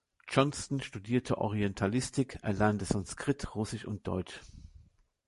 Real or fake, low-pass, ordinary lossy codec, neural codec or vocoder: fake; 14.4 kHz; MP3, 48 kbps; vocoder, 44.1 kHz, 128 mel bands every 512 samples, BigVGAN v2